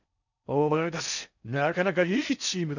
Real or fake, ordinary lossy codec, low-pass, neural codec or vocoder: fake; none; 7.2 kHz; codec, 16 kHz in and 24 kHz out, 0.6 kbps, FocalCodec, streaming, 4096 codes